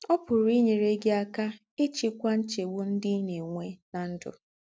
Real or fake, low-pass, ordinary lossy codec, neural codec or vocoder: real; none; none; none